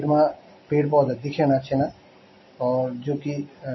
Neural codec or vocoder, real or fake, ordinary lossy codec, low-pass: none; real; MP3, 24 kbps; 7.2 kHz